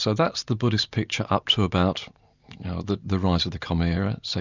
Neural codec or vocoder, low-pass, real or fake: none; 7.2 kHz; real